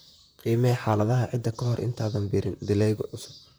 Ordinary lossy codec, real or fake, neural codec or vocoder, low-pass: none; fake; vocoder, 44.1 kHz, 128 mel bands, Pupu-Vocoder; none